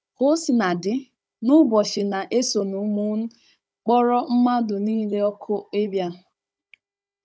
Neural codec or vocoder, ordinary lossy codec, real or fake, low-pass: codec, 16 kHz, 16 kbps, FunCodec, trained on Chinese and English, 50 frames a second; none; fake; none